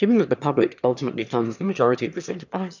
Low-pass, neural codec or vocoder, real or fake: 7.2 kHz; autoencoder, 22.05 kHz, a latent of 192 numbers a frame, VITS, trained on one speaker; fake